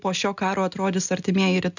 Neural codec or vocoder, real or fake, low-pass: none; real; 7.2 kHz